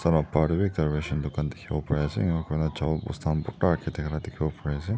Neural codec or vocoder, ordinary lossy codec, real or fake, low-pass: none; none; real; none